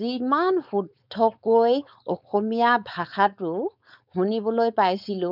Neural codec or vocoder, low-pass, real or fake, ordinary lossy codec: codec, 16 kHz, 4.8 kbps, FACodec; 5.4 kHz; fake; none